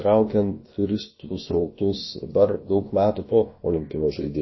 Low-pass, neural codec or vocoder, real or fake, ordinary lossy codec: 7.2 kHz; codec, 16 kHz, about 1 kbps, DyCAST, with the encoder's durations; fake; MP3, 24 kbps